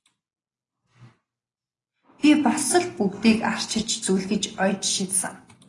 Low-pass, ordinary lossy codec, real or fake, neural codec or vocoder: 10.8 kHz; AAC, 32 kbps; real; none